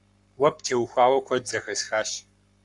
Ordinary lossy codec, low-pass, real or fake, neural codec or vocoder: Opus, 64 kbps; 10.8 kHz; fake; codec, 44.1 kHz, 7.8 kbps, Pupu-Codec